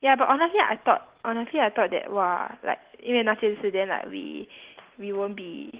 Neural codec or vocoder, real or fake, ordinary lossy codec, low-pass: none; real; Opus, 16 kbps; 3.6 kHz